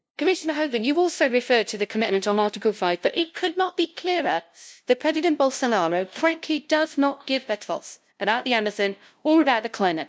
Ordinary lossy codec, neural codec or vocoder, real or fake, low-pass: none; codec, 16 kHz, 0.5 kbps, FunCodec, trained on LibriTTS, 25 frames a second; fake; none